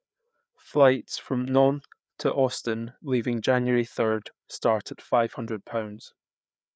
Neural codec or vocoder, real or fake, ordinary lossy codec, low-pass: codec, 16 kHz, 4 kbps, FreqCodec, larger model; fake; none; none